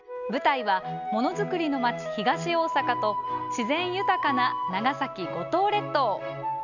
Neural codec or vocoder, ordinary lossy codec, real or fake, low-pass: none; none; real; 7.2 kHz